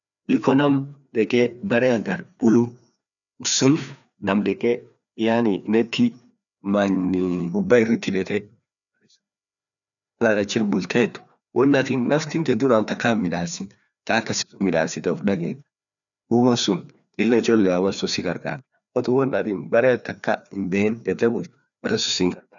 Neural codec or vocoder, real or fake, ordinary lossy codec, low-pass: codec, 16 kHz, 2 kbps, FreqCodec, larger model; fake; none; 7.2 kHz